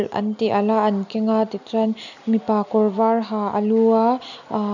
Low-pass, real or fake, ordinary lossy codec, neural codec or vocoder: 7.2 kHz; real; none; none